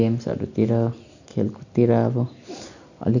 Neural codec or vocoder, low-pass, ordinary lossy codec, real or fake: none; 7.2 kHz; none; real